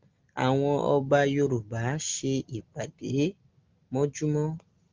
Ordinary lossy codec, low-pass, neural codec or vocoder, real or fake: Opus, 24 kbps; 7.2 kHz; none; real